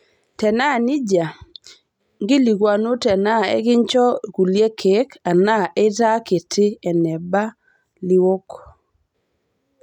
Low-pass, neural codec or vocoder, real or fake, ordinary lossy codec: 19.8 kHz; none; real; none